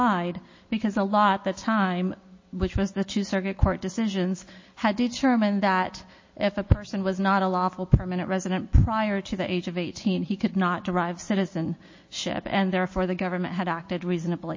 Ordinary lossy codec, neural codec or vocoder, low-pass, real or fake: MP3, 32 kbps; none; 7.2 kHz; real